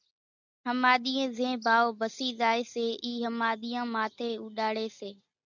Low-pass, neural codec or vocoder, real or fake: 7.2 kHz; none; real